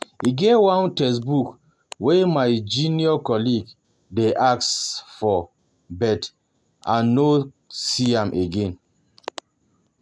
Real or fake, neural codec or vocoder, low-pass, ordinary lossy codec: real; none; none; none